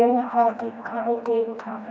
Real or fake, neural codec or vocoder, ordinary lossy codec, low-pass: fake; codec, 16 kHz, 1 kbps, FreqCodec, smaller model; none; none